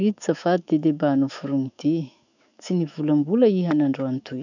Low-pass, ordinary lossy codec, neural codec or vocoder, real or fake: 7.2 kHz; none; autoencoder, 48 kHz, 128 numbers a frame, DAC-VAE, trained on Japanese speech; fake